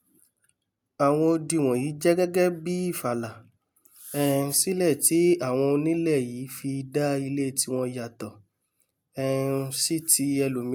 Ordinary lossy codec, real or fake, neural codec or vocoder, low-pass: none; real; none; none